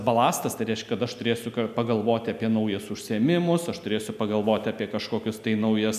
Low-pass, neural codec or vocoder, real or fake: 14.4 kHz; none; real